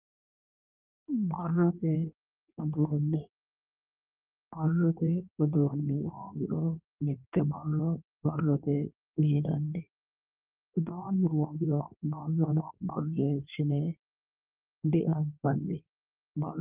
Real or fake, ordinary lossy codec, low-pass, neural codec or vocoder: fake; Opus, 32 kbps; 3.6 kHz; codec, 16 kHz in and 24 kHz out, 1.1 kbps, FireRedTTS-2 codec